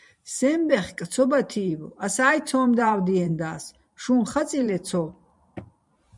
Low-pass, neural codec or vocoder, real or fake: 10.8 kHz; vocoder, 44.1 kHz, 128 mel bands every 512 samples, BigVGAN v2; fake